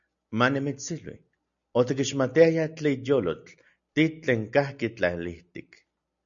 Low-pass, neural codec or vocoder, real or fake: 7.2 kHz; none; real